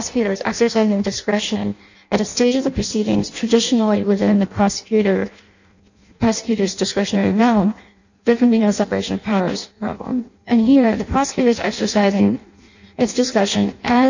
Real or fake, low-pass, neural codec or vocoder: fake; 7.2 kHz; codec, 16 kHz in and 24 kHz out, 0.6 kbps, FireRedTTS-2 codec